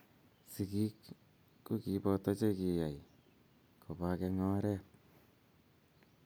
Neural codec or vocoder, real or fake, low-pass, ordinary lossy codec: none; real; none; none